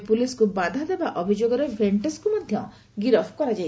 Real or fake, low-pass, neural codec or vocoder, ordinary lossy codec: real; none; none; none